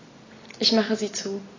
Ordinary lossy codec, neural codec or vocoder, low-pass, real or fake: AAC, 32 kbps; none; 7.2 kHz; real